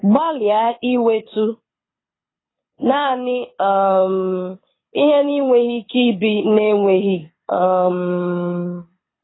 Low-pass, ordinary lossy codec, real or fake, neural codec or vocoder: 7.2 kHz; AAC, 16 kbps; fake; codec, 24 kHz, 6 kbps, HILCodec